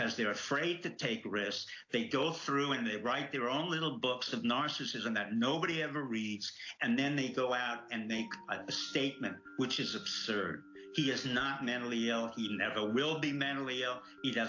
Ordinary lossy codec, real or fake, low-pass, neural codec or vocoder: AAC, 48 kbps; real; 7.2 kHz; none